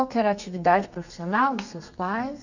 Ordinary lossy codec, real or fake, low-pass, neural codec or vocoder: none; fake; 7.2 kHz; codec, 32 kHz, 1.9 kbps, SNAC